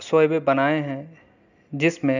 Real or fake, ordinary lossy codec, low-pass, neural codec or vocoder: real; none; 7.2 kHz; none